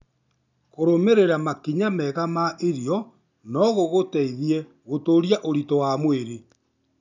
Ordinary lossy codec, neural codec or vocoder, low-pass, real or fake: none; none; 7.2 kHz; real